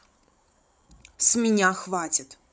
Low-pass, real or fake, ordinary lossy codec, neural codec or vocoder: none; fake; none; codec, 16 kHz, 16 kbps, FreqCodec, larger model